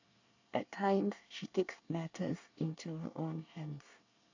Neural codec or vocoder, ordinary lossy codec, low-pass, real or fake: codec, 24 kHz, 1 kbps, SNAC; AAC, 48 kbps; 7.2 kHz; fake